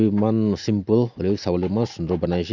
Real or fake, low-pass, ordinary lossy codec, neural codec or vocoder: real; 7.2 kHz; none; none